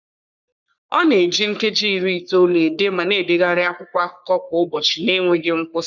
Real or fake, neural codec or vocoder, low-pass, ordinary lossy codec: fake; codec, 44.1 kHz, 3.4 kbps, Pupu-Codec; 7.2 kHz; none